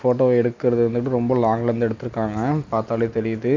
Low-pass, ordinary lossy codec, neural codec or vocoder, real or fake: 7.2 kHz; MP3, 64 kbps; none; real